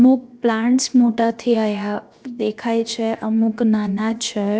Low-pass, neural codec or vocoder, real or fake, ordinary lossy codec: none; codec, 16 kHz, 0.7 kbps, FocalCodec; fake; none